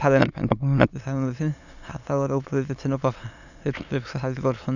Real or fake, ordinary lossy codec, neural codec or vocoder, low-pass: fake; none; autoencoder, 22.05 kHz, a latent of 192 numbers a frame, VITS, trained on many speakers; 7.2 kHz